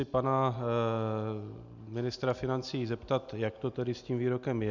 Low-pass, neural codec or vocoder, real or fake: 7.2 kHz; none; real